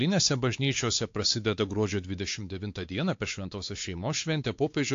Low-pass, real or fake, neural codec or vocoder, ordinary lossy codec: 7.2 kHz; fake; codec, 16 kHz, 4 kbps, X-Codec, WavLM features, trained on Multilingual LibriSpeech; AAC, 48 kbps